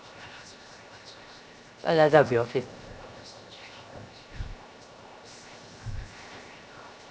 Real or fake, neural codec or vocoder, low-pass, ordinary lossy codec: fake; codec, 16 kHz, 0.7 kbps, FocalCodec; none; none